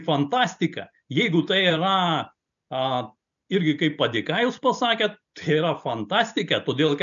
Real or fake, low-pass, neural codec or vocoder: real; 7.2 kHz; none